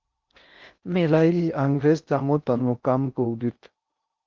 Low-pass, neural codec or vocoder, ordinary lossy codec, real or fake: 7.2 kHz; codec, 16 kHz in and 24 kHz out, 0.6 kbps, FocalCodec, streaming, 4096 codes; Opus, 24 kbps; fake